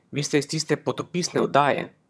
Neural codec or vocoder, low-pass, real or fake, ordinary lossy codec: vocoder, 22.05 kHz, 80 mel bands, HiFi-GAN; none; fake; none